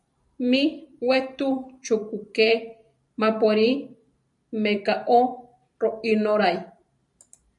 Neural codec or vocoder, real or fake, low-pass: vocoder, 44.1 kHz, 128 mel bands every 512 samples, BigVGAN v2; fake; 10.8 kHz